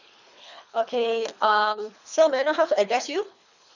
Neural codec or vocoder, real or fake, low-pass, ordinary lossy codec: codec, 24 kHz, 3 kbps, HILCodec; fake; 7.2 kHz; none